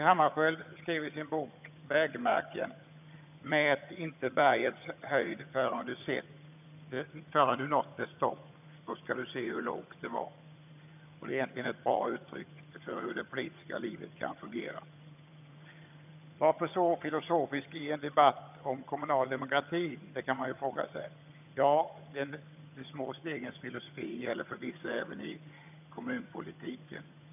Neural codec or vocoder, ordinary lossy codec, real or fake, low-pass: vocoder, 22.05 kHz, 80 mel bands, HiFi-GAN; none; fake; 3.6 kHz